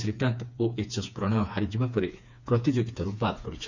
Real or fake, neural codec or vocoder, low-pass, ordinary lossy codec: fake; codec, 16 kHz, 4 kbps, FreqCodec, smaller model; 7.2 kHz; none